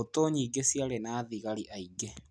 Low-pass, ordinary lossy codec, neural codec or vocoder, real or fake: none; none; none; real